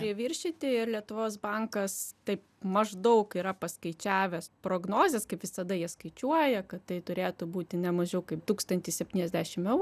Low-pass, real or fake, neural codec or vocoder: 14.4 kHz; real; none